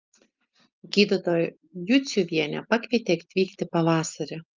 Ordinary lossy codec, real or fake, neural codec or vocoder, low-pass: Opus, 24 kbps; real; none; 7.2 kHz